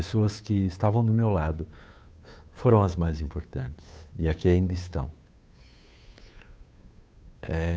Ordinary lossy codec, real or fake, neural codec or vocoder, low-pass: none; fake; codec, 16 kHz, 2 kbps, FunCodec, trained on Chinese and English, 25 frames a second; none